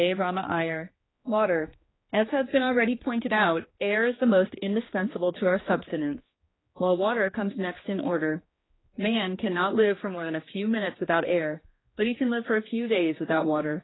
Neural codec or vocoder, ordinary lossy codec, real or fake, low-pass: codec, 16 kHz, 2 kbps, X-Codec, HuBERT features, trained on general audio; AAC, 16 kbps; fake; 7.2 kHz